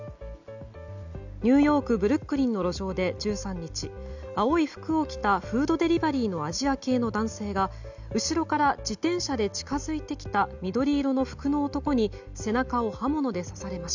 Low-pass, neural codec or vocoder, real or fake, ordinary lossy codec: 7.2 kHz; none; real; none